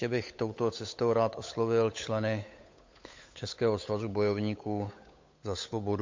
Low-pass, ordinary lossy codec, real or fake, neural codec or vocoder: 7.2 kHz; MP3, 48 kbps; fake; codec, 16 kHz, 8 kbps, FunCodec, trained on Chinese and English, 25 frames a second